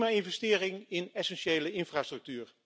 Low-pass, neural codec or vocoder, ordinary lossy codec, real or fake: none; none; none; real